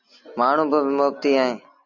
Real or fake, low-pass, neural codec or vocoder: real; 7.2 kHz; none